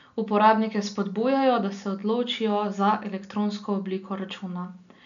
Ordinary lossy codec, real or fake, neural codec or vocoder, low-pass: none; real; none; 7.2 kHz